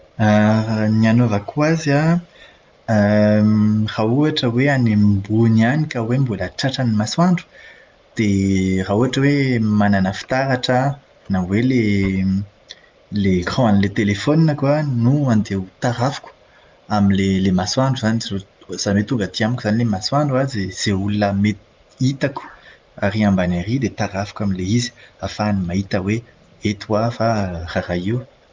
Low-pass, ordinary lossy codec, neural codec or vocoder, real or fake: 7.2 kHz; Opus, 32 kbps; none; real